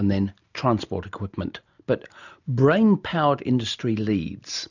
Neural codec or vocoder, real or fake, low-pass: none; real; 7.2 kHz